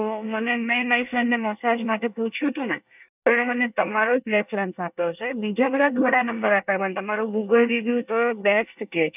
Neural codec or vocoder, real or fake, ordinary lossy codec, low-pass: codec, 24 kHz, 1 kbps, SNAC; fake; none; 3.6 kHz